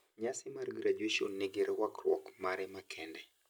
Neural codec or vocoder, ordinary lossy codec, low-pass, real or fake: none; none; none; real